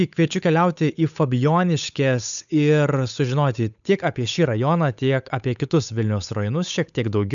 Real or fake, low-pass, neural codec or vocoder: fake; 7.2 kHz; codec, 16 kHz, 8 kbps, FunCodec, trained on Chinese and English, 25 frames a second